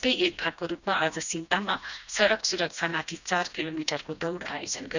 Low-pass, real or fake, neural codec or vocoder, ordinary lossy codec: 7.2 kHz; fake; codec, 16 kHz, 1 kbps, FreqCodec, smaller model; none